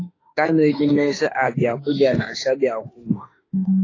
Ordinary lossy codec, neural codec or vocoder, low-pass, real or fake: AAC, 32 kbps; autoencoder, 48 kHz, 32 numbers a frame, DAC-VAE, trained on Japanese speech; 7.2 kHz; fake